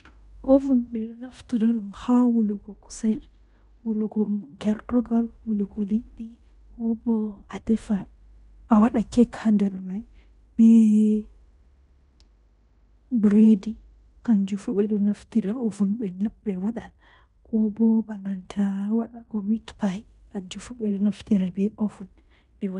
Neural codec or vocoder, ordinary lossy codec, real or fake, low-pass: codec, 16 kHz in and 24 kHz out, 0.9 kbps, LongCat-Audio-Codec, fine tuned four codebook decoder; none; fake; 10.8 kHz